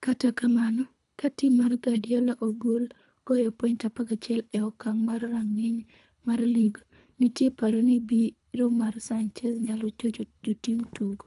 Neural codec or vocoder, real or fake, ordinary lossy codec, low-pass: codec, 24 kHz, 3 kbps, HILCodec; fake; none; 10.8 kHz